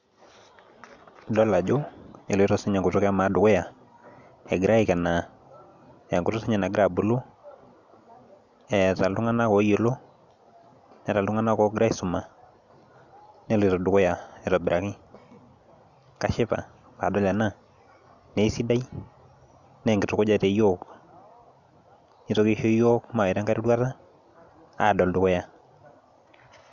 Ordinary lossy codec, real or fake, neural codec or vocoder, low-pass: none; real; none; 7.2 kHz